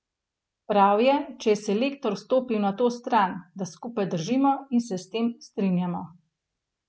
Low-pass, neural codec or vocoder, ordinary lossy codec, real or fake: none; none; none; real